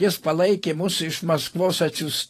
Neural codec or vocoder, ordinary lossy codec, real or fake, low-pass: vocoder, 44.1 kHz, 128 mel bands every 512 samples, BigVGAN v2; AAC, 48 kbps; fake; 14.4 kHz